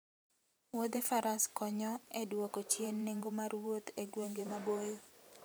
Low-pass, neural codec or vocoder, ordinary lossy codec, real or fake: none; vocoder, 44.1 kHz, 128 mel bands every 512 samples, BigVGAN v2; none; fake